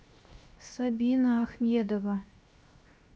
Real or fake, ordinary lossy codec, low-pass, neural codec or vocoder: fake; none; none; codec, 16 kHz, 0.7 kbps, FocalCodec